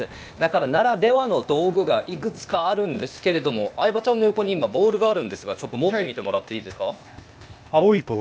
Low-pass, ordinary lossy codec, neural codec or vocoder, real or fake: none; none; codec, 16 kHz, 0.8 kbps, ZipCodec; fake